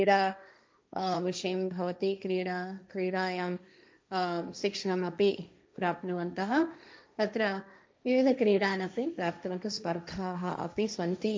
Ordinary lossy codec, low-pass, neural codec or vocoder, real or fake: none; none; codec, 16 kHz, 1.1 kbps, Voila-Tokenizer; fake